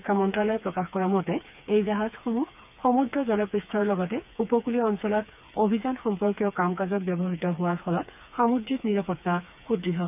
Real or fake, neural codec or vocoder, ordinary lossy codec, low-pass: fake; codec, 16 kHz, 4 kbps, FreqCodec, smaller model; none; 3.6 kHz